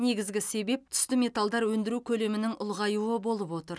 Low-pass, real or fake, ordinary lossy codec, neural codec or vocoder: none; real; none; none